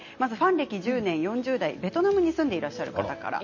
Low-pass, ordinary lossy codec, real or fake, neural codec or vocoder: 7.2 kHz; MP3, 32 kbps; real; none